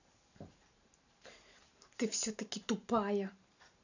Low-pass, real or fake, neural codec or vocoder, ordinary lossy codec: 7.2 kHz; fake; vocoder, 44.1 kHz, 128 mel bands every 512 samples, BigVGAN v2; none